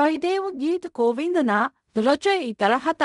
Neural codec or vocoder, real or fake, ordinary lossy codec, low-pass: codec, 16 kHz in and 24 kHz out, 0.4 kbps, LongCat-Audio-Codec, fine tuned four codebook decoder; fake; none; 10.8 kHz